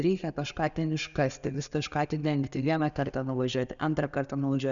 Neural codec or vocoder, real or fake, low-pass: none; real; 7.2 kHz